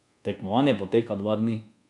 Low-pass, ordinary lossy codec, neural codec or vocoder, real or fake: 10.8 kHz; none; codec, 24 kHz, 1.2 kbps, DualCodec; fake